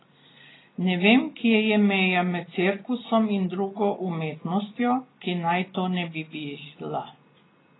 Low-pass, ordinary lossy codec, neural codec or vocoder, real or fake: 7.2 kHz; AAC, 16 kbps; none; real